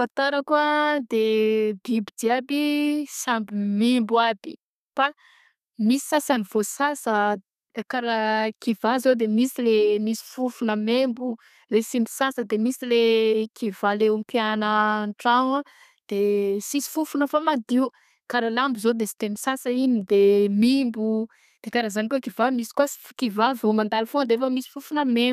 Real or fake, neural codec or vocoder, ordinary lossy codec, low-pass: fake; codec, 32 kHz, 1.9 kbps, SNAC; none; 14.4 kHz